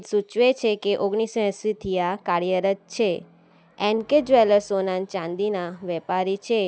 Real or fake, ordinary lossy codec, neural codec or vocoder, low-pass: real; none; none; none